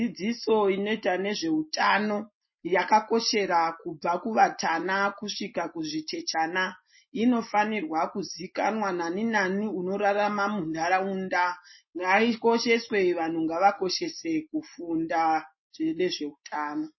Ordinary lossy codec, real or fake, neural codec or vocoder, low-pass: MP3, 24 kbps; real; none; 7.2 kHz